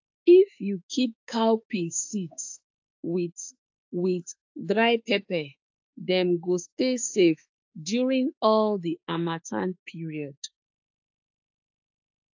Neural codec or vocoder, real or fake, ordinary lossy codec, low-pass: autoencoder, 48 kHz, 32 numbers a frame, DAC-VAE, trained on Japanese speech; fake; AAC, 48 kbps; 7.2 kHz